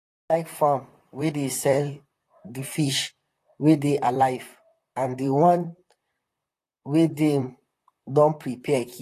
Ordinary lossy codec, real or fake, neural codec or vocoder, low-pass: AAC, 48 kbps; fake; vocoder, 44.1 kHz, 128 mel bands, Pupu-Vocoder; 14.4 kHz